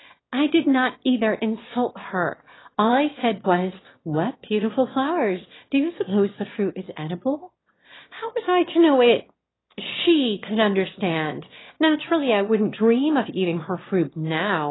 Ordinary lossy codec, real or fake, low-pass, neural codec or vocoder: AAC, 16 kbps; fake; 7.2 kHz; autoencoder, 22.05 kHz, a latent of 192 numbers a frame, VITS, trained on one speaker